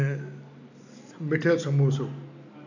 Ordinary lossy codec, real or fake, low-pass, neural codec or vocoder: none; real; 7.2 kHz; none